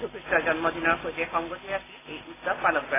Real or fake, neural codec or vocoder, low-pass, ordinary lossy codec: real; none; 3.6 kHz; AAC, 16 kbps